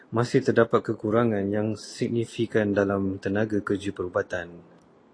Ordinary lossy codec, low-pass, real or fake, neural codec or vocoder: AAC, 32 kbps; 9.9 kHz; real; none